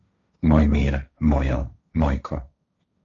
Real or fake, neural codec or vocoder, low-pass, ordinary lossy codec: fake; codec, 16 kHz, 1.1 kbps, Voila-Tokenizer; 7.2 kHz; MP3, 64 kbps